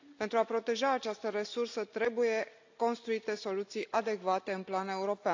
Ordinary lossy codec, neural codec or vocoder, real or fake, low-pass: AAC, 48 kbps; none; real; 7.2 kHz